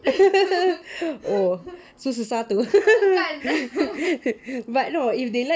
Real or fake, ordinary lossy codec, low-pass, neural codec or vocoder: real; none; none; none